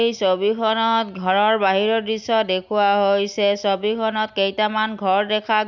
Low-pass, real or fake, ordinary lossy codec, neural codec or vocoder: 7.2 kHz; real; none; none